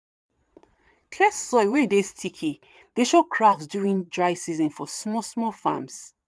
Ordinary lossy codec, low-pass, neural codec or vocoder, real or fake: none; none; vocoder, 22.05 kHz, 80 mel bands, Vocos; fake